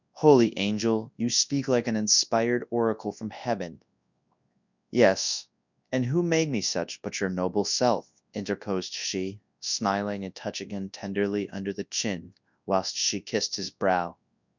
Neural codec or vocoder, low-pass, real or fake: codec, 24 kHz, 0.9 kbps, WavTokenizer, large speech release; 7.2 kHz; fake